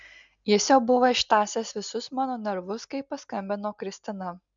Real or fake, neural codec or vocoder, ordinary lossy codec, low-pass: real; none; MP3, 64 kbps; 7.2 kHz